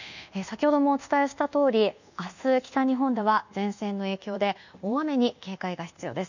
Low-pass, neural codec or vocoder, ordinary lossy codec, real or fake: 7.2 kHz; codec, 24 kHz, 1.2 kbps, DualCodec; none; fake